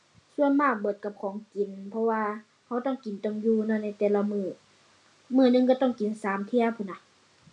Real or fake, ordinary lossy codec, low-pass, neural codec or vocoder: real; none; 10.8 kHz; none